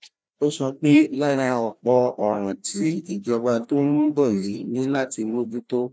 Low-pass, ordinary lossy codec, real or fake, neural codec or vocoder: none; none; fake; codec, 16 kHz, 1 kbps, FreqCodec, larger model